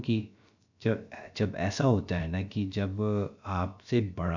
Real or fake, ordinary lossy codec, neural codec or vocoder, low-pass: fake; none; codec, 16 kHz, about 1 kbps, DyCAST, with the encoder's durations; 7.2 kHz